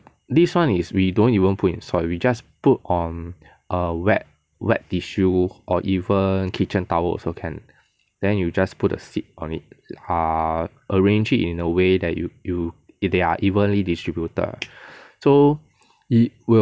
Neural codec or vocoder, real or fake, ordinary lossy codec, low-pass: none; real; none; none